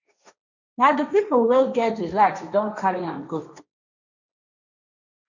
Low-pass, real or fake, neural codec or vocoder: 7.2 kHz; fake; codec, 16 kHz, 1.1 kbps, Voila-Tokenizer